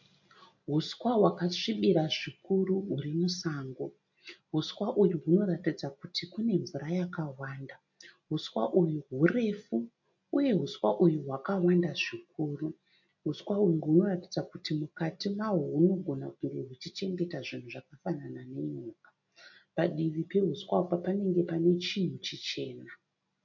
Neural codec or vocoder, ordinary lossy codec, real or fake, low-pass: none; MP3, 48 kbps; real; 7.2 kHz